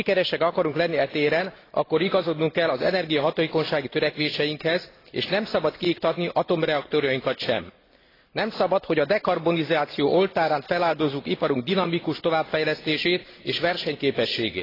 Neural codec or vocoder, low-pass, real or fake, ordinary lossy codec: none; 5.4 kHz; real; AAC, 24 kbps